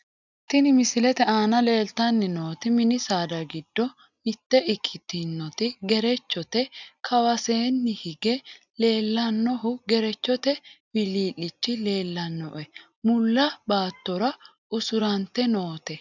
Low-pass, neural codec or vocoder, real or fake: 7.2 kHz; none; real